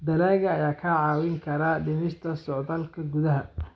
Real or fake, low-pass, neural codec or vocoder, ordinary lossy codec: real; none; none; none